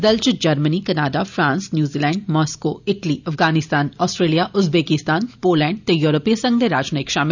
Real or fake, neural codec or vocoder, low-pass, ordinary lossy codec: real; none; 7.2 kHz; none